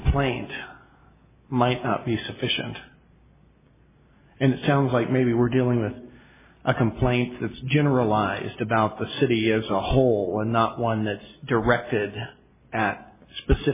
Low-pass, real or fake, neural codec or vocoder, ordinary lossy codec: 3.6 kHz; real; none; MP3, 16 kbps